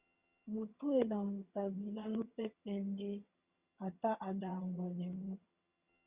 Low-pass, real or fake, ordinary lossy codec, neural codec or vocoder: 3.6 kHz; fake; Opus, 64 kbps; vocoder, 22.05 kHz, 80 mel bands, HiFi-GAN